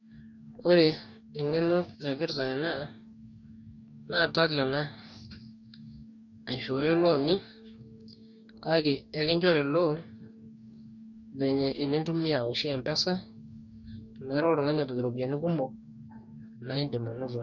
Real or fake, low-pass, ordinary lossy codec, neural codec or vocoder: fake; 7.2 kHz; none; codec, 44.1 kHz, 2.6 kbps, DAC